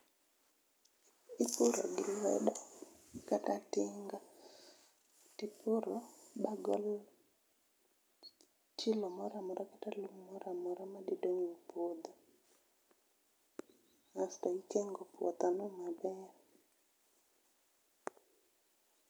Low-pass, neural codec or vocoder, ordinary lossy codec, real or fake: none; none; none; real